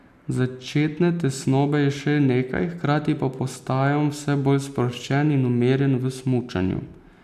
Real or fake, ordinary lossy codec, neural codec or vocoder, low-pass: real; none; none; 14.4 kHz